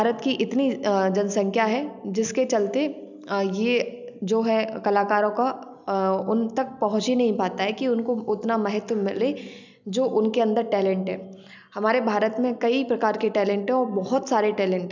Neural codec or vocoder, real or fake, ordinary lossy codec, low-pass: none; real; none; 7.2 kHz